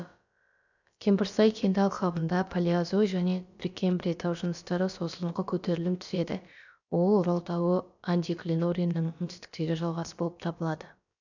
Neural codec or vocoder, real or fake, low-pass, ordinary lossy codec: codec, 16 kHz, about 1 kbps, DyCAST, with the encoder's durations; fake; 7.2 kHz; none